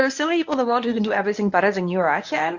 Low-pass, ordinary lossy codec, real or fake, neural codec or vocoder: 7.2 kHz; AAC, 48 kbps; fake; codec, 24 kHz, 0.9 kbps, WavTokenizer, medium speech release version 1